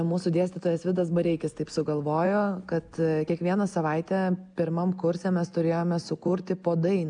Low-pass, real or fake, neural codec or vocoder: 9.9 kHz; real; none